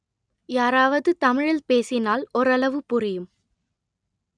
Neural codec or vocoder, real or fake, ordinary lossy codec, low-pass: none; real; none; 9.9 kHz